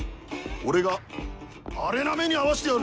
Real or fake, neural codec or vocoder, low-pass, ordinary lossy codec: real; none; none; none